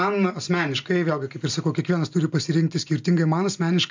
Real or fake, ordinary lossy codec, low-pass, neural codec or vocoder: real; MP3, 64 kbps; 7.2 kHz; none